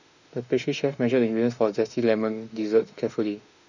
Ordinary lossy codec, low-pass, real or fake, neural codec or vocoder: none; 7.2 kHz; fake; autoencoder, 48 kHz, 32 numbers a frame, DAC-VAE, trained on Japanese speech